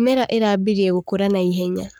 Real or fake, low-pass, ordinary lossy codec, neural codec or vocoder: fake; none; none; codec, 44.1 kHz, 7.8 kbps, Pupu-Codec